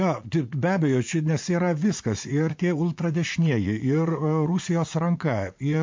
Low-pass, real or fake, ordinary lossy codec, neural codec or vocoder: 7.2 kHz; fake; MP3, 48 kbps; vocoder, 44.1 kHz, 80 mel bands, Vocos